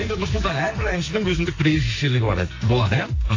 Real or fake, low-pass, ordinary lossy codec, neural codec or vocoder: fake; 7.2 kHz; AAC, 32 kbps; codec, 44.1 kHz, 2.6 kbps, SNAC